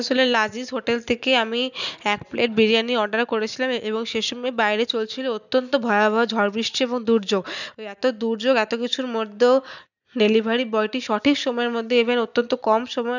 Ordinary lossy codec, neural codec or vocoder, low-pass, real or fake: none; none; 7.2 kHz; real